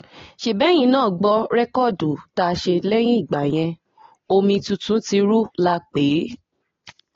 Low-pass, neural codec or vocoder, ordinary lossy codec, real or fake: 7.2 kHz; none; AAC, 32 kbps; real